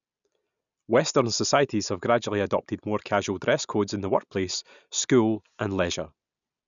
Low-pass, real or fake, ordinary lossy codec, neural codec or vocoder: 7.2 kHz; real; none; none